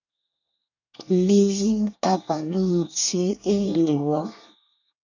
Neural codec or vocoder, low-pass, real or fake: codec, 24 kHz, 1 kbps, SNAC; 7.2 kHz; fake